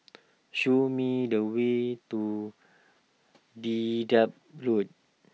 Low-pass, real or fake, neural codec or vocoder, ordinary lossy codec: none; real; none; none